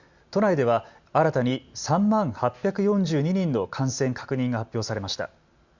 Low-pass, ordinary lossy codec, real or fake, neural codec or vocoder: 7.2 kHz; Opus, 64 kbps; real; none